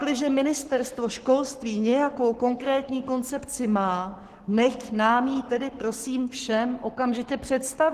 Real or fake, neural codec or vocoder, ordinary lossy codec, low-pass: fake; codec, 44.1 kHz, 7.8 kbps, Pupu-Codec; Opus, 16 kbps; 14.4 kHz